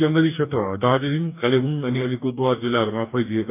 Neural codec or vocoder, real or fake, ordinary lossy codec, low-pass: codec, 44.1 kHz, 2.6 kbps, DAC; fake; none; 3.6 kHz